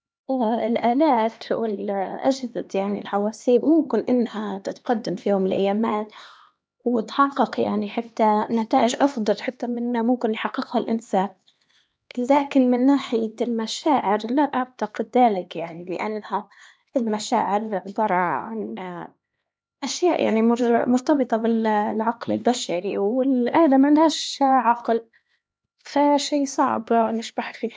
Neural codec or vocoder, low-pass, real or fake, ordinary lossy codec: codec, 16 kHz, 2 kbps, X-Codec, HuBERT features, trained on LibriSpeech; none; fake; none